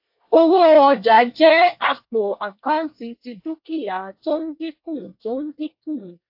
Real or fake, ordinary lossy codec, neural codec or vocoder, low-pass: fake; AAC, 48 kbps; codec, 24 kHz, 1 kbps, SNAC; 5.4 kHz